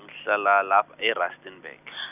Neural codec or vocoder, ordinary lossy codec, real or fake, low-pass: none; none; real; 3.6 kHz